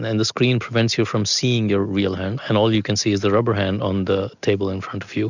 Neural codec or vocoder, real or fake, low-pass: none; real; 7.2 kHz